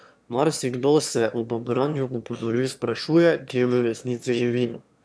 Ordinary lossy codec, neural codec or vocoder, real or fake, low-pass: none; autoencoder, 22.05 kHz, a latent of 192 numbers a frame, VITS, trained on one speaker; fake; none